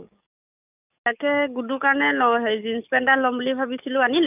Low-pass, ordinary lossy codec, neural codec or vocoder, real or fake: 3.6 kHz; none; codec, 44.1 kHz, 7.8 kbps, DAC; fake